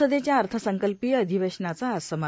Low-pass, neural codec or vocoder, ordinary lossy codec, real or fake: none; none; none; real